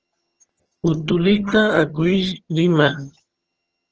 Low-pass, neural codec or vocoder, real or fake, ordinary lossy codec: 7.2 kHz; vocoder, 22.05 kHz, 80 mel bands, HiFi-GAN; fake; Opus, 16 kbps